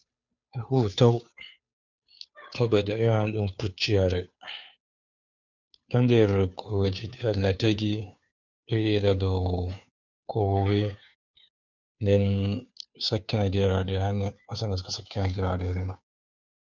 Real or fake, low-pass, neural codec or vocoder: fake; 7.2 kHz; codec, 16 kHz, 2 kbps, FunCodec, trained on Chinese and English, 25 frames a second